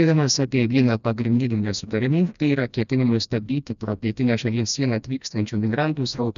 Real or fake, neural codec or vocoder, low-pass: fake; codec, 16 kHz, 1 kbps, FreqCodec, smaller model; 7.2 kHz